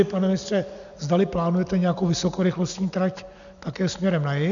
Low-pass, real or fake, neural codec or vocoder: 7.2 kHz; real; none